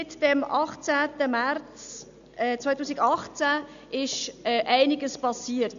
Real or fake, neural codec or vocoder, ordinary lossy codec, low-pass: real; none; none; 7.2 kHz